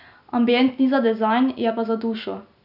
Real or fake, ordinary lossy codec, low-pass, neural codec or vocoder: real; none; 5.4 kHz; none